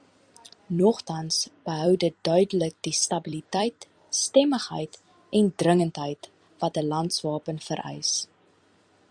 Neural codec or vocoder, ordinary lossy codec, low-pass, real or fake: vocoder, 44.1 kHz, 128 mel bands every 256 samples, BigVGAN v2; Opus, 64 kbps; 9.9 kHz; fake